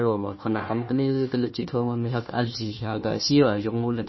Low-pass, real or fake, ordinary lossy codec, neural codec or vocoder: 7.2 kHz; fake; MP3, 24 kbps; codec, 16 kHz, 1 kbps, FunCodec, trained on Chinese and English, 50 frames a second